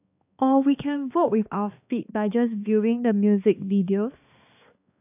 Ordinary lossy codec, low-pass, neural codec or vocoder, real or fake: AAC, 32 kbps; 3.6 kHz; codec, 16 kHz, 2 kbps, X-Codec, HuBERT features, trained on balanced general audio; fake